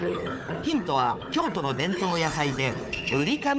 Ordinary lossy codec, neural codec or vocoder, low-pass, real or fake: none; codec, 16 kHz, 4 kbps, FunCodec, trained on Chinese and English, 50 frames a second; none; fake